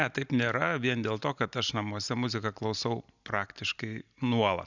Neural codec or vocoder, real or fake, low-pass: none; real; 7.2 kHz